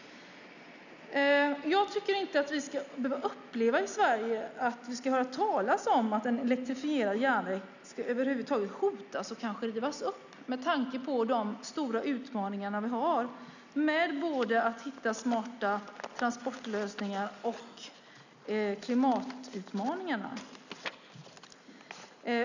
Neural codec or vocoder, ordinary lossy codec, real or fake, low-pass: none; none; real; 7.2 kHz